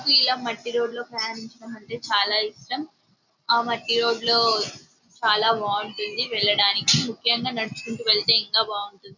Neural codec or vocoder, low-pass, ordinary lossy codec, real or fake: none; 7.2 kHz; none; real